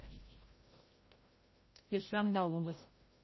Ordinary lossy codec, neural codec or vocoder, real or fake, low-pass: MP3, 24 kbps; codec, 16 kHz, 0.5 kbps, FreqCodec, larger model; fake; 7.2 kHz